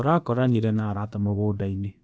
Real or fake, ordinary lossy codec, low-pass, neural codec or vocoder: fake; none; none; codec, 16 kHz, about 1 kbps, DyCAST, with the encoder's durations